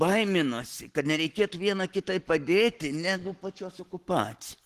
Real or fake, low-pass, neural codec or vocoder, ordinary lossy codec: fake; 14.4 kHz; codec, 44.1 kHz, 7.8 kbps, Pupu-Codec; Opus, 16 kbps